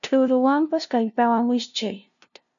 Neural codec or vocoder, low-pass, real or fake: codec, 16 kHz, 0.5 kbps, FunCodec, trained on LibriTTS, 25 frames a second; 7.2 kHz; fake